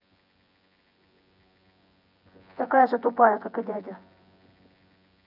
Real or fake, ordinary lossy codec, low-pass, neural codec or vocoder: fake; none; 5.4 kHz; vocoder, 24 kHz, 100 mel bands, Vocos